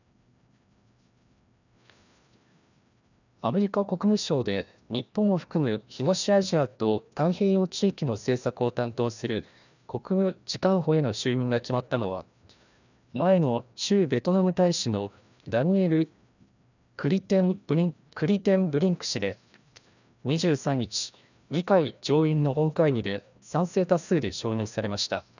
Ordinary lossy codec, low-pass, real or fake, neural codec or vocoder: none; 7.2 kHz; fake; codec, 16 kHz, 1 kbps, FreqCodec, larger model